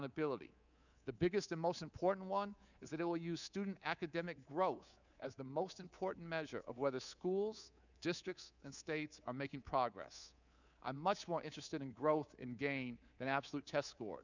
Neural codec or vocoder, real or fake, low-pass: codec, 24 kHz, 3.1 kbps, DualCodec; fake; 7.2 kHz